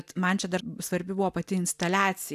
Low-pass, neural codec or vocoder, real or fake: 14.4 kHz; none; real